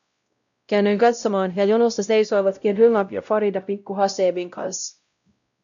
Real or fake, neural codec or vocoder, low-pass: fake; codec, 16 kHz, 0.5 kbps, X-Codec, WavLM features, trained on Multilingual LibriSpeech; 7.2 kHz